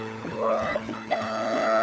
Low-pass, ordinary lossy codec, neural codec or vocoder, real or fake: none; none; codec, 16 kHz, 16 kbps, FunCodec, trained on LibriTTS, 50 frames a second; fake